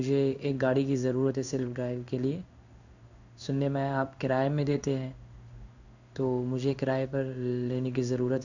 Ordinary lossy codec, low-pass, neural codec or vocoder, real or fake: none; 7.2 kHz; codec, 16 kHz in and 24 kHz out, 1 kbps, XY-Tokenizer; fake